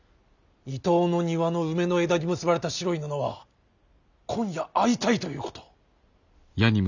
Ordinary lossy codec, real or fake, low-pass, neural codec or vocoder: none; real; 7.2 kHz; none